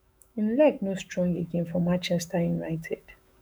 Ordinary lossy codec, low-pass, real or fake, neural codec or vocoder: none; none; fake; autoencoder, 48 kHz, 128 numbers a frame, DAC-VAE, trained on Japanese speech